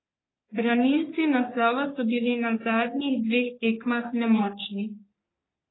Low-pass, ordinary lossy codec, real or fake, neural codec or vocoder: 7.2 kHz; AAC, 16 kbps; fake; codec, 44.1 kHz, 3.4 kbps, Pupu-Codec